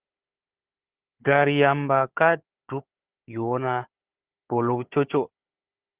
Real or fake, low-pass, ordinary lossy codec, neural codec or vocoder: fake; 3.6 kHz; Opus, 16 kbps; codec, 16 kHz, 4 kbps, FunCodec, trained on Chinese and English, 50 frames a second